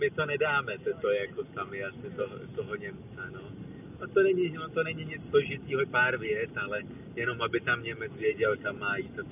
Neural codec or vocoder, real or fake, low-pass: none; real; 3.6 kHz